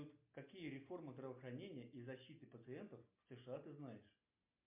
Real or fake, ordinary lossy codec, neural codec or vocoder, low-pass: real; MP3, 32 kbps; none; 3.6 kHz